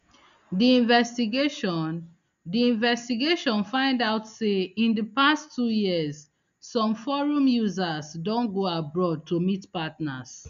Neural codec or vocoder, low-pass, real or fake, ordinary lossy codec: none; 7.2 kHz; real; none